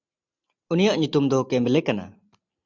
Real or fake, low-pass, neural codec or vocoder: real; 7.2 kHz; none